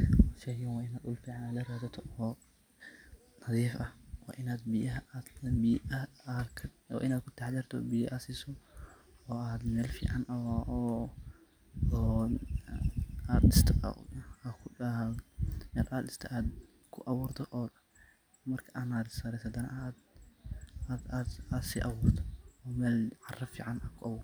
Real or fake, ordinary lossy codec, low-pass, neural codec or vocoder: real; none; none; none